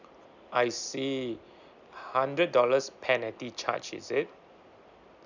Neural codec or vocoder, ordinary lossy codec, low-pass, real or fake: none; none; 7.2 kHz; real